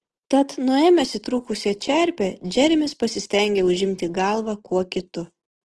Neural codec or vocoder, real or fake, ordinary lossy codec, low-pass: none; real; Opus, 16 kbps; 10.8 kHz